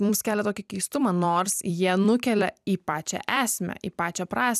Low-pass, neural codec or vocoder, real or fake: 14.4 kHz; vocoder, 44.1 kHz, 128 mel bands every 256 samples, BigVGAN v2; fake